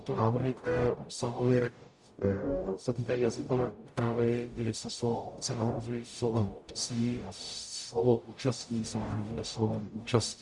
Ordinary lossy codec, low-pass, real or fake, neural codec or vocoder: Opus, 64 kbps; 10.8 kHz; fake; codec, 44.1 kHz, 0.9 kbps, DAC